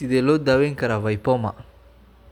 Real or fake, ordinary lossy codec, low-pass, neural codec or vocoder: real; none; 19.8 kHz; none